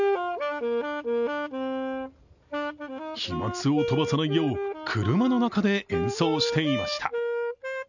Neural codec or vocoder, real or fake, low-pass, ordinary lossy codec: none; real; 7.2 kHz; none